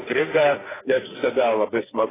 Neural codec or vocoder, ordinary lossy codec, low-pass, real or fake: codec, 44.1 kHz, 2.6 kbps, SNAC; AAC, 16 kbps; 3.6 kHz; fake